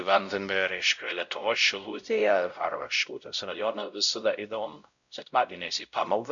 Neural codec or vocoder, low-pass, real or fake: codec, 16 kHz, 0.5 kbps, X-Codec, WavLM features, trained on Multilingual LibriSpeech; 7.2 kHz; fake